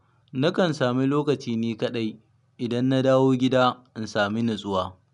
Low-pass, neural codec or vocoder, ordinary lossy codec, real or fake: 10.8 kHz; none; none; real